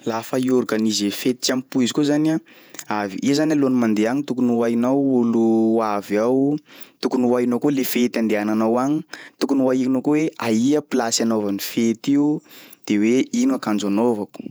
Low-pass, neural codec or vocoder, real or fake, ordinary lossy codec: none; vocoder, 48 kHz, 128 mel bands, Vocos; fake; none